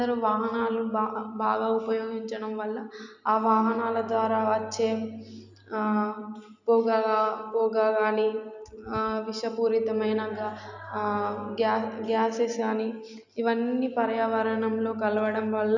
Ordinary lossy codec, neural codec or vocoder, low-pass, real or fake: none; none; 7.2 kHz; real